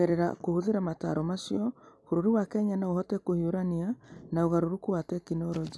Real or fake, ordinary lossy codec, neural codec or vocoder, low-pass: real; none; none; none